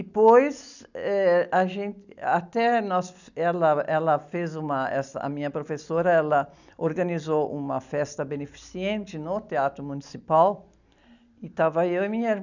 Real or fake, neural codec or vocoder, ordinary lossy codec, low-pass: real; none; none; 7.2 kHz